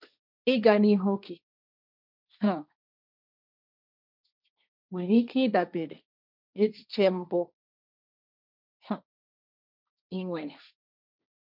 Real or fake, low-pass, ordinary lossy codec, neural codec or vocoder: fake; 5.4 kHz; none; codec, 16 kHz, 1.1 kbps, Voila-Tokenizer